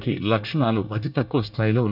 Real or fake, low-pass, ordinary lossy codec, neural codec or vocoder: fake; 5.4 kHz; none; codec, 24 kHz, 1 kbps, SNAC